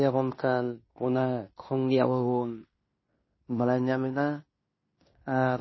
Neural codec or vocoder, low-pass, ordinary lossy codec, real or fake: codec, 16 kHz in and 24 kHz out, 0.9 kbps, LongCat-Audio-Codec, fine tuned four codebook decoder; 7.2 kHz; MP3, 24 kbps; fake